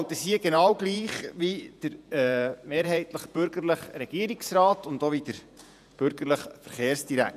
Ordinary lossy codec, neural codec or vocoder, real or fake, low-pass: none; vocoder, 48 kHz, 128 mel bands, Vocos; fake; 14.4 kHz